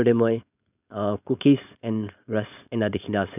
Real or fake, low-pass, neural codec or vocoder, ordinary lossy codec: fake; 3.6 kHz; codec, 16 kHz, 4.8 kbps, FACodec; none